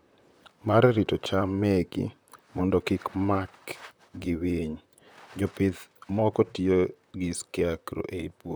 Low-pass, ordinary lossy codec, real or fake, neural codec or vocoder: none; none; fake; vocoder, 44.1 kHz, 128 mel bands, Pupu-Vocoder